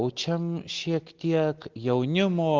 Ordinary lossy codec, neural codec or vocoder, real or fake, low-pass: Opus, 16 kbps; none; real; 7.2 kHz